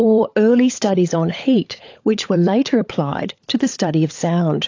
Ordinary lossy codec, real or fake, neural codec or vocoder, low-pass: AAC, 48 kbps; fake; codec, 16 kHz, 16 kbps, FunCodec, trained on LibriTTS, 50 frames a second; 7.2 kHz